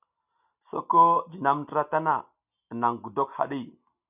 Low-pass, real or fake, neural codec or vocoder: 3.6 kHz; real; none